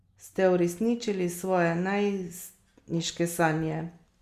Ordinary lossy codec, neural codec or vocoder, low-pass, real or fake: Opus, 64 kbps; none; 14.4 kHz; real